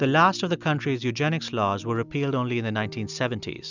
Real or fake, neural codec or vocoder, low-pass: real; none; 7.2 kHz